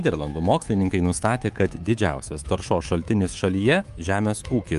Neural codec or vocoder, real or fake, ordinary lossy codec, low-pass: codec, 24 kHz, 3.1 kbps, DualCodec; fake; Opus, 32 kbps; 10.8 kHz